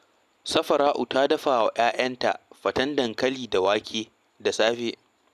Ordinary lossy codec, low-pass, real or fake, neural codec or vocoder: none; 14.4 kHz; real; none